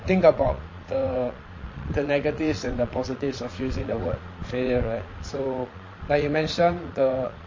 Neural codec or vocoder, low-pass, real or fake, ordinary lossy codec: vocoder, 22.05 kHz, 80 mel bands, Vocos; 7.2 kHz; fake; MP3, 32 kbps